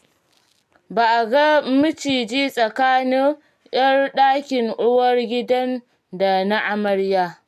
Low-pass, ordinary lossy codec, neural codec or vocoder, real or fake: 14.4 kHz; none; none; real